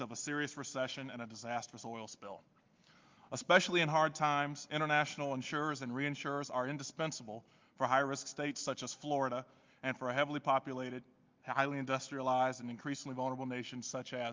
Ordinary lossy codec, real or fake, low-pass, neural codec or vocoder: Opus, 24 kbps; real; 7.2 kHz; none